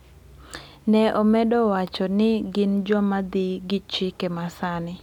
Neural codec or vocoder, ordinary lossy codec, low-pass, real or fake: none; none; 19.8 kHz; real